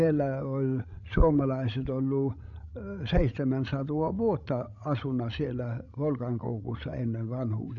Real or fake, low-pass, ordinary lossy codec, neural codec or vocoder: fake; 7.2 kHz; none; codec, 16 kHz, 16 kbps, FreqCodec, larger model